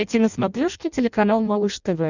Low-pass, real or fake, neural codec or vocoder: 7.2 kHz; fake; codec, 16 kHz in and 24 kHz out, 0.6 kbps, FireRedTTS-2 codec